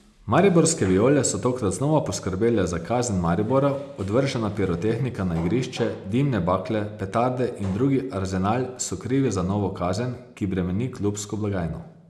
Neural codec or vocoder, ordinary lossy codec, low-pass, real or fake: none; none; none; real